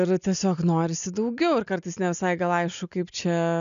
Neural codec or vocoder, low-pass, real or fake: none; 7.2 kHz; real